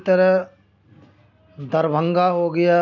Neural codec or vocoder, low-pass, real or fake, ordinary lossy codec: none; 7.2 kHz; real; none